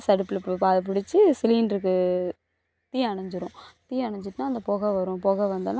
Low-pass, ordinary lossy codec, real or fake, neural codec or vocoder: none; none; real; none